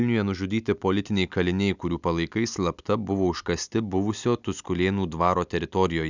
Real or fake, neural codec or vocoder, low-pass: real; none; 7.2 kHz